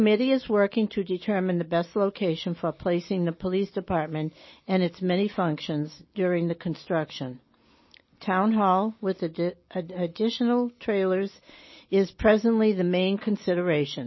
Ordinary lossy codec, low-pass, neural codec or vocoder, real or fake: MP3, 24 kbps; 7.2 kHz; none; real